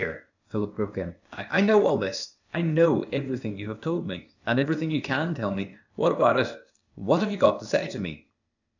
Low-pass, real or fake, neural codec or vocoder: 7.2 kHz; fake; codec, 16 kHz, 0.8 kbps, ZipCodec